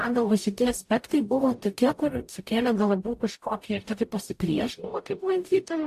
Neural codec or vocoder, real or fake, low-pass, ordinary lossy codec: codec, 44.1 kHz, 0.9 kbps, DAC; fake; 14.4 kHz; AAC, 64 kbps